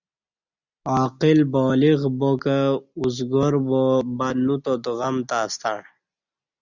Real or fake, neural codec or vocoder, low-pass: real; none; 7.2 kHz